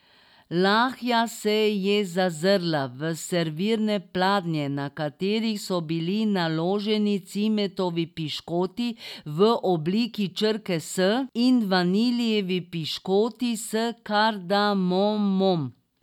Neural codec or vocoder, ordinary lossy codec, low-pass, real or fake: none; none; 19.8 kHz; real